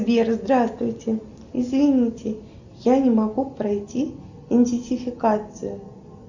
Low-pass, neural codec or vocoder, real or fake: 7.2 kHz; none; real